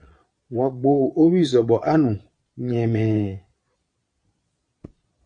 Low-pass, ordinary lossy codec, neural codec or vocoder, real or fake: 9.9 kHz; AAC, 64 kbps; vocoder, 22.05 kHz, 80 mel bands, Vocos; fake